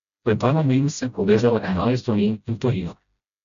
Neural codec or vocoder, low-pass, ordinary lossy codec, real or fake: codec, 16 kHz, 0.5 kbps, FreqCodec, smaller model; 7.2 kHz; none; fake